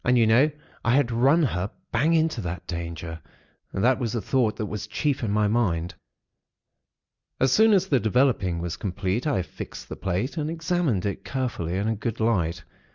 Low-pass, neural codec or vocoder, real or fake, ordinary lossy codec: 7.2 kHz; none; real; Opus, 64 kbps